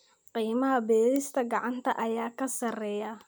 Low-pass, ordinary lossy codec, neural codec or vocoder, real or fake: none; none; none; real